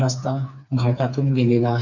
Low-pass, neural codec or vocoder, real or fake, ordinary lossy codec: 7.2 kHz; codec, 16 kHz, 4 kbps, FreqCodec, smaller model; fake; none